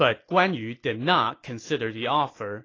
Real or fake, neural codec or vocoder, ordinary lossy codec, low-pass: real; none; AAC, 32 kbps; 7.2 kHz